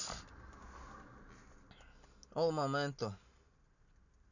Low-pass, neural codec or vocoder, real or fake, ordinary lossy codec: 7.2 kHz; none; real; none